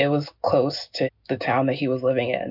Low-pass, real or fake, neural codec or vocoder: 5.4 kHz; real; none